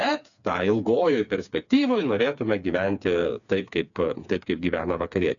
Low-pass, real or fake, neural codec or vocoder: 7.2 kHz; fake; codec, 16 kHz, 4 kbps, FreqCodec, smaller model